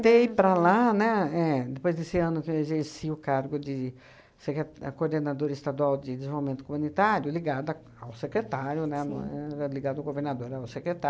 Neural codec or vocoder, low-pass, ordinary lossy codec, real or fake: none; none; none; real